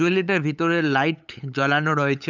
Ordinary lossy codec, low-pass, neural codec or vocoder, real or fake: none; 7.2 kHz; codec, 16 kHz, 8 kbps, FunCodec, trained on LibriTTS, 25 frames a second; fake